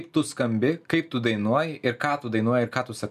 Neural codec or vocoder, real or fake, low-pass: none; real; 14.4 kHz